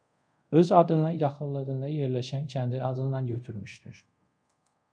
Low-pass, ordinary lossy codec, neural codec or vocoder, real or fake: 9.9 kHz; MP3, 96 kbps; codec, 24 kHz, 0.5 kbps, DualCodec; fake